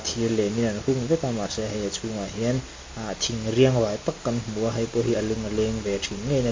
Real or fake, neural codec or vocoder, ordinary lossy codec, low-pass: real; none; MP3, 32 kbps; 7.2 kHz